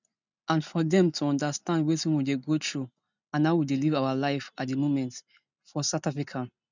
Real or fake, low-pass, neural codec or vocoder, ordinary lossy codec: real; 7.2 kHz; none; none